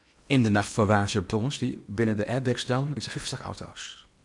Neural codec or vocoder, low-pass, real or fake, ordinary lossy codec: codec, 16 kHz in and 24 kHz out, 0.8 kbps, FocalCodec, streaming, 65536 codes; 10.8 kHz; fake; MP3, 96 kbps